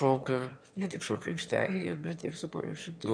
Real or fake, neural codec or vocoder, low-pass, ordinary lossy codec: fake; autoencoder, 22.05 kHz, a latent of 192 numbers a frame, VITS, trained on one speaker; 9.9 kHz; MP3, 96 kbps